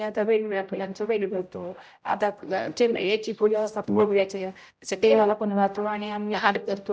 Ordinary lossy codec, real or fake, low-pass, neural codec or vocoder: none; fake; none; codec, 16 kHz, 0.5 kbps, X-Codec, HuBERT features, trained on general audio